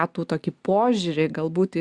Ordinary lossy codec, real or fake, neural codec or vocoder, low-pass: Opus, 64 kbps; real; none; 10.8 kHz